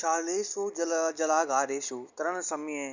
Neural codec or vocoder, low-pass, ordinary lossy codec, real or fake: none; 7.2 kHz; none; real